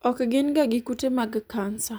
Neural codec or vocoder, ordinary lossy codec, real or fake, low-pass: vocoder, 44.1 kHz, 128 mel bands every 256 samples, BigVGAN v2; none; fake; none